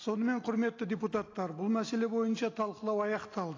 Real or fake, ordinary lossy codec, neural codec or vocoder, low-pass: real; AAC, 32 kbps; none; 7.2 kHz